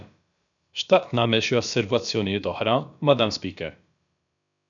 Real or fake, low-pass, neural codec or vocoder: fake; 7.2 kHz; codec, 16 kHz, about 1 kbps, DyCAST, with the encoder's durations